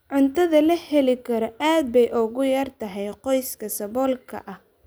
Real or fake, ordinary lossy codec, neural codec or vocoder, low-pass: real; none; none; none